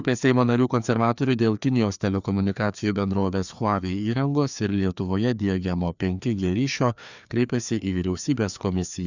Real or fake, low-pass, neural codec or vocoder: fake; 7.2 kHz; codec, 16 kHz, 2 kbps, FreqCodec, larger model